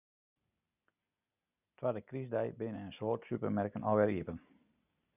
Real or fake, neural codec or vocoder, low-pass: real; none; 3.6 kHz